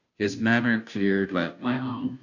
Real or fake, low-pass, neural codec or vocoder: fake; 7.2 kHz; codec, 16 kHz, 0.5 kbps, FunCodec, trained on Chinese and English, 25 frames a second